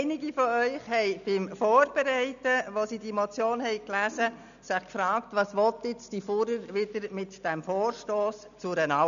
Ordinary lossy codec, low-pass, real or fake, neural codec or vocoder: none; 7.2 kHz; real; none